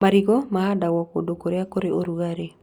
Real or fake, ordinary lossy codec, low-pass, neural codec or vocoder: real; Opus, 64 kbps; 19.8 kHz; none